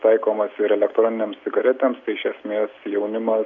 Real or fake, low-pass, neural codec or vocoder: real; 7.2 kHz; none